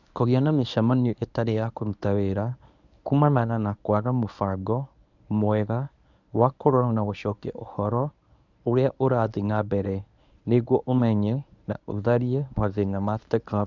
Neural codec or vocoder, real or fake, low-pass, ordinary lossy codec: codec, 24 kHz, 0.9 kbps, WavTokenizer, medium speech release version 1; fake; 7.2 kHz; none